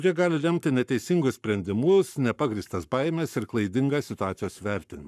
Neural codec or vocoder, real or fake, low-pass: codec, 44.1 kHz, 7.8 kbps, Pupu-Codec; fake; 14.4 kHz